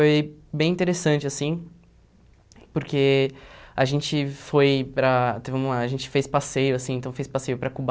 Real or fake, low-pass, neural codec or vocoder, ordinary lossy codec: real; none; none; none